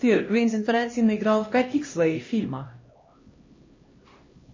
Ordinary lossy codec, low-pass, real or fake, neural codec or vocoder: MP3, 32 kbps; 7.2 kHz; fake; codec, 16 kHz, 1 kbps, X-Codec, HuBERT features, trained on LibriSpeech